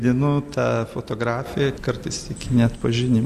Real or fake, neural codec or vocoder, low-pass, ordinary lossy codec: real; none; 14.4 kHz; Opus, 64 kbps